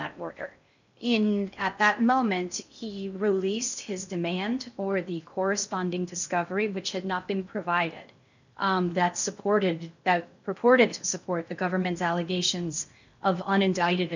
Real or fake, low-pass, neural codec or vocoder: fake; 7.2 kHz; codec, 16 kHz in and 24 kHz out, 0.6 kbps, FocalCodec, streaming, 2048 codes